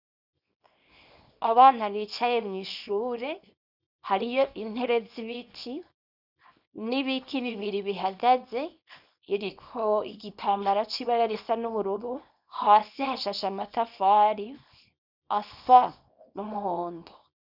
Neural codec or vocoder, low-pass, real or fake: codec, 24 kHz, 0.9 kbps, WavTokenizer, small release; 5.4 kHz; fake